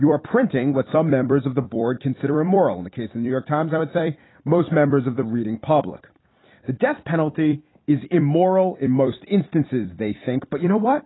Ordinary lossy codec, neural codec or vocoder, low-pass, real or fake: AAC, 16 kbps; vocoder, 44.1 kHz, 128 mel bands every 256 samples, BigVGAN v2; 7.2 kHz; fake